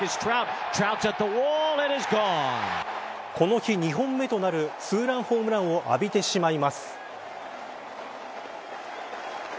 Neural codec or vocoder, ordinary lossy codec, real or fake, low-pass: none; none; real; none